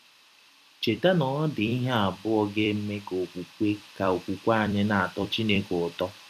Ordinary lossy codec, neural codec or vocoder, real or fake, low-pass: none; vocoder, 44.1 kHz, 128 mel bands every 256 samples, BigVGAN v2; fake; 14.4 kHz